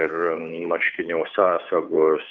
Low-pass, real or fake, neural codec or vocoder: 7.2 kHz; fake; codec, 16 kHz, 2 kbps, FunCodec, trained on Chinese and English, 25 frames a second